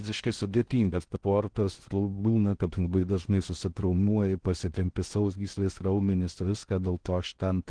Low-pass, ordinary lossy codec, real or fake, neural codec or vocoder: 9.9 kHz; Opus, 16 kbps; fake; codec, 16 kHz in and 24 kHz out, 0.6 kbps, FocalCodec, streaming, 2048 codes